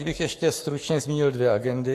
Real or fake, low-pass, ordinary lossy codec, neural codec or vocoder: fake; 14.4 kHz; AAC, 64 kbps; codec, 44.1 kHz, 7.8 kbps, Pupu-Codec